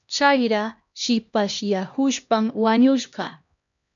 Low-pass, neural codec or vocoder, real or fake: 7.2 kHz; codec, 16 kHz, 1 kbps, X-Codec, HuBERT features, trained on LibriSpeech; fake